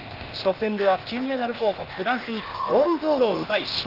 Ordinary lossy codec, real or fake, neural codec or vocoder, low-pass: Opus, 32 kbps; fake; codec, 16 kHz, 0.8 kbps, ZipCodec; 5.4 kHz